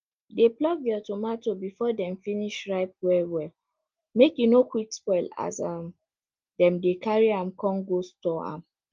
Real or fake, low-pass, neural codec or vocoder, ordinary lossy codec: real; 7.2 kHz; none; Opus, 32 kbps